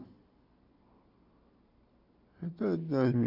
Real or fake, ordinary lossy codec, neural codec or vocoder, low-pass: real; none; none; 5.4 kHz